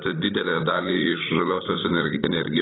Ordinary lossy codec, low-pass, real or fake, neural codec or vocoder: AAC, 16 kbps; 7.2 kHz; fake; codec, 16 kHz, 16 kbps, FreqCodec, larger model